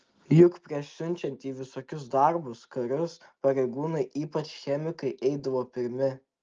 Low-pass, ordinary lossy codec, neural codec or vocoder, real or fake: 7.2 kHz; Opus, 24 kbps; none; real